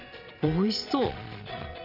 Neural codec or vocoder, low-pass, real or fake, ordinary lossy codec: vocoder, 22.05 kHz, 80 mel bands, Vocos; 5.4 kHz; fake; none